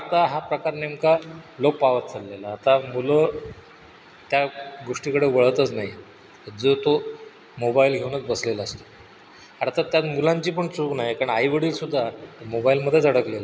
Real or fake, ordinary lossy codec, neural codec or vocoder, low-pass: real; none; none; none